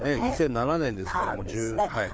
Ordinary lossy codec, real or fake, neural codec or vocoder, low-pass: none; fake; codec, 16 kHz, 4 kbps, FreqCodec, larger model; none